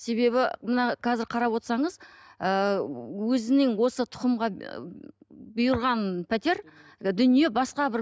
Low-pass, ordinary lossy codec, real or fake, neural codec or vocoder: none; none; real; none